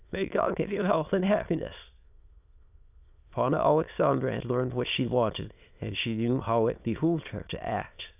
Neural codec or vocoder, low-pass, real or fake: autoencoder, 22.05 kHz, a latent of 192 numbers a frame, VITS, trained on many speakers; 3.6 kHz; fake